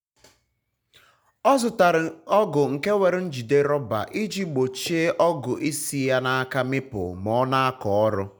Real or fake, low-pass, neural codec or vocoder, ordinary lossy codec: real; 19.8 kHz; none; none